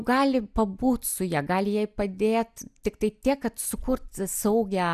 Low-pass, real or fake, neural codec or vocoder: 14.4 kHz; real; none